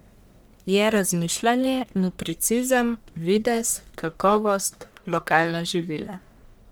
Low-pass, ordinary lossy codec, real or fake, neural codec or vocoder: none; none; fake; codec, 44.1 kHz, 1.7 kbps, Pupu-Codec